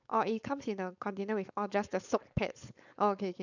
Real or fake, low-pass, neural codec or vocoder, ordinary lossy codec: fake; 7.2 kHz; codec, 16 kHz, 4.8 kbps, FACodec; none